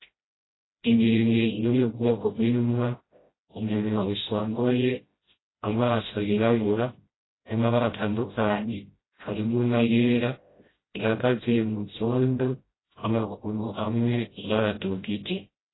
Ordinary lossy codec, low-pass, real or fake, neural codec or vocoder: AAC, 16 kbps; 7.2 kHz; fake; codec, 16 kHz, 0.5 kbps, FreqCodec, smaller model